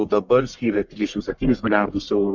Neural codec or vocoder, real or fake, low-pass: codec, 44.1 kHz, 1.7 kbps, Pupu-Codec; fake; 7.2 kHz